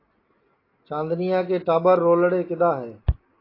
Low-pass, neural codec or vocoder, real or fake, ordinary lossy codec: 5.4 kHz; none; real; AAC, 24 kbps